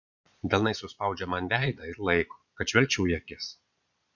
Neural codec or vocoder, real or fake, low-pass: vocoder, 22.05 kHz, 80 mel bands, Vocos; fake; 7.2 kHz